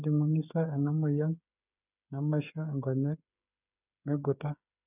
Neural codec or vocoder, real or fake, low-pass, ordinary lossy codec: codec, 16 kHz, 8 kbps, FreqCodec, smaller model; fake; 3.6 kHz; none